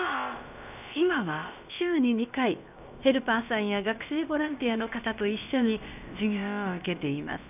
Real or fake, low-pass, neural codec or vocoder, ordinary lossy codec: fake; 3.6 kHz; codec, 16 kHz, about 1 kbps, DyCAST, with the encoder's durations; none